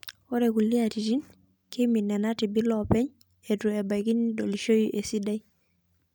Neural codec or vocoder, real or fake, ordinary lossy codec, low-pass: none; real; none; none